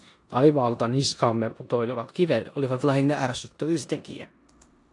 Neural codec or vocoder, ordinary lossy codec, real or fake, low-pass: codec, 16 kHz in and 24 kHz out, 0.9 kbps, LongCat-Audio-Codec, four codebook decoder; AAC, 48 kbps; fake; 10.8 kHz